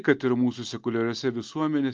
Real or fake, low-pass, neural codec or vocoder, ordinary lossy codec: real; 7.2 kHz; none; Opus, 32 kbps